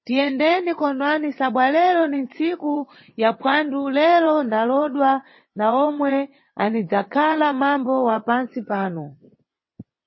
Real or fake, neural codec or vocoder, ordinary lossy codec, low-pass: fake; vocoder, 22.05 kHz, 80 mel bands, WaveNeXt; MP3, 24 kbps; 7.2 kHz